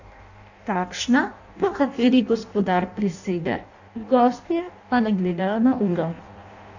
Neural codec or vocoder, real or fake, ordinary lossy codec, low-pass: codec, 16 kHz in and 24 kHz out, 0.6 kbps, FireRedTTS-2 codec; fake; none; 7.2 kHz